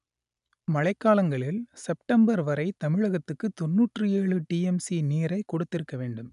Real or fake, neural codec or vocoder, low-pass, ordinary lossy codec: real; none; 10.8 kHz; none